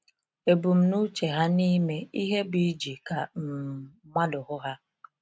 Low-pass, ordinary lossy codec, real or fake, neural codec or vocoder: none; none; real; none